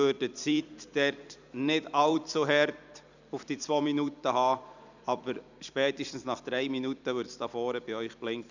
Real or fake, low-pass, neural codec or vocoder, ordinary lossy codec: fake; 7.2 kHz; vocoder, 44.1 kHz, 128 mel bands every 256 samples, BigVGAN v2; none